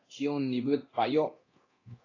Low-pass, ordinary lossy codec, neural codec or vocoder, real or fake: 7.2 kHz; AAC, 32 kbps; codec, 24 kHz, 0.9 kbps, DualCodec; fake